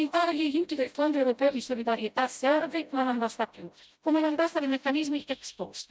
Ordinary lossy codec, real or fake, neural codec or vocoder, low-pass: none; fake; codec, 16 kHz, 0.5 kbps, FreqCodec, smaller model; none